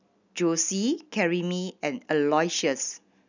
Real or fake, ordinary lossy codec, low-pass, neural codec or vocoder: real; none; 7.2 kHz; none